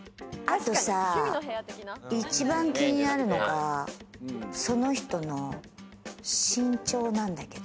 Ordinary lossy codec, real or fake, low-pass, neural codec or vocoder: none; real; none; none